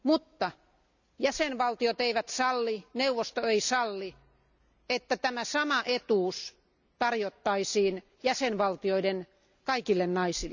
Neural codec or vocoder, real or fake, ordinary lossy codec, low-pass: none; real; none; 7.2 kHz